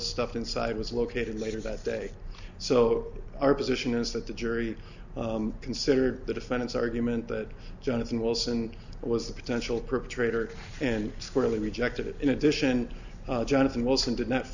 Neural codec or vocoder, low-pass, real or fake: none; 7.2 kHz; real